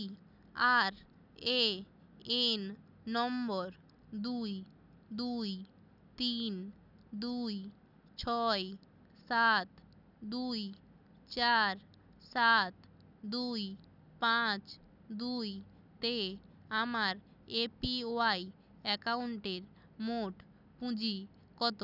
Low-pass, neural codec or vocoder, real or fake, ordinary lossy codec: 5.4 kHz; none; real; none